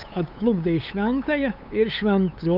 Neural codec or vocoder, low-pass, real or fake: codec, 16 kHz, 8 kbps, FunCodec, trained on LibriTTS, 25 frames a second; 5.4 kHz; fake